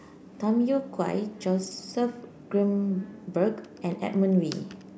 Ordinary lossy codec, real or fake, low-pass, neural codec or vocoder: none; real; none; none